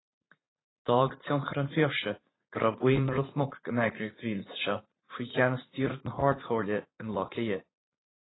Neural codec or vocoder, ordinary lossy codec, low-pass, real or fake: vocoder, 44.1 kHz, 80 mel bands, Vocos; AAC, 16 kbps; 7.2 kHz; fake